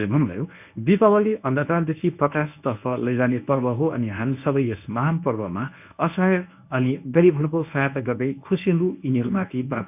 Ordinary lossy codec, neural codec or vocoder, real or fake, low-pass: none; codec, 24 kHz, 0.9 kbps, WavTokenizer, medium speech release version 1; fake; 3.6 kHz